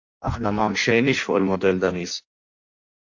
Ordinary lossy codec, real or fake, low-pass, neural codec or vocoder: AAC, 48 kbps; fake; 7.2 kHz; codec, 16 kHz in and 24 kHz out, 0.6 kbps, FireRedTTS-2 codec